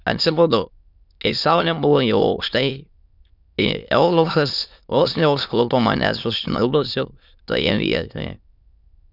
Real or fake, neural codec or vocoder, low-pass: fake; autoencoder, 22.05 kHz, a latent of 192 numbers a frame, VITS, trained on many speakers; 5.4 kHz